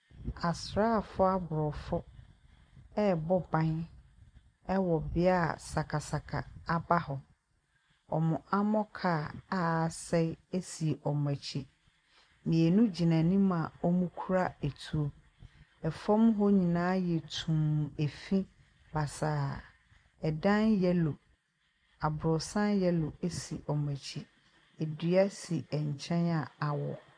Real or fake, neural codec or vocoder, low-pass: real; none; 9.9 kHz